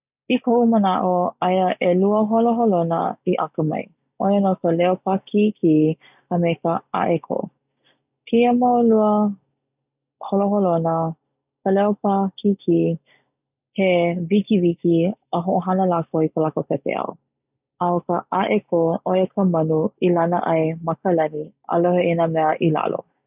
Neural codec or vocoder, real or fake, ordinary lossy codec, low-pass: none; real; none; 3.6 kHz